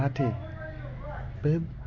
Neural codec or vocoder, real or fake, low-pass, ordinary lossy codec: none; real; 7.2 kHz; MP3, 48 kbps